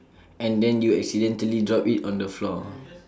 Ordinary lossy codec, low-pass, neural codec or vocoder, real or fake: none; none; none; real